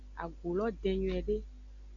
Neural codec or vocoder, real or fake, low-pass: none; real; 7.2 kHz